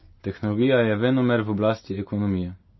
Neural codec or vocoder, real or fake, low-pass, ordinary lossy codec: none; real; 7.2 kHz; MP3, 24 kbps